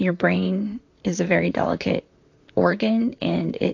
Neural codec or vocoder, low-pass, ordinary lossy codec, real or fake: vocoder, 44.1 kHz, 128 mel bands, Pupu-Vocoder; 7.2 kHz; AAC, 48 kbps; fake